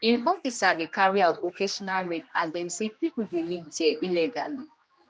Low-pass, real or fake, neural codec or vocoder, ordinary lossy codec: none; fake; codec, 16 kHz, 1 kbps, X-Codec, HuBERT features, trained on general audio; none